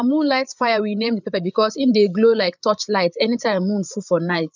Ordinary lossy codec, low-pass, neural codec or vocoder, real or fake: none; 7.2 kHz; codec, 16 kHz, 8 kbps, FreqCodec, larger model; fake